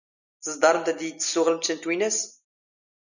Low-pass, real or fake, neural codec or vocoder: 7.2 kHz; real; none